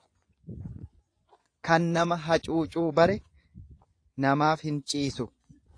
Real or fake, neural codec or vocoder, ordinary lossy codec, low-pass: fake; vocoder, 24 kHz, 100 mel bands, Vocos; AAC, 48 kbps; 9.9 kHz